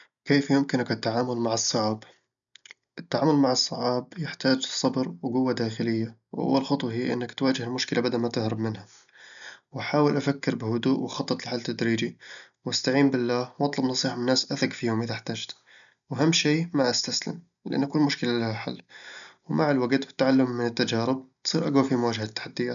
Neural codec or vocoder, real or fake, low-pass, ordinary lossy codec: none; real; 7.2 kHz; none